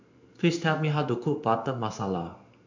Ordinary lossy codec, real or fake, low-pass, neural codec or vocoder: MP3, 48 kbps; fake; 7.2 kHz; codec, 16 kHz in and 24 kHz out, 1 kbps, XY-Tokenizer